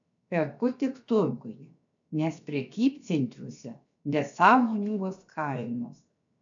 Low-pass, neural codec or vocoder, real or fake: 7.2 kHz; codec, 16 kHz, 0.7 kbps, FocalCodec; fake